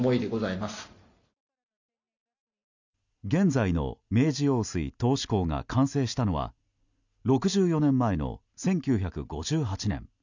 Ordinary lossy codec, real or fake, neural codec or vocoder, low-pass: none; real; none; 7.2 kHz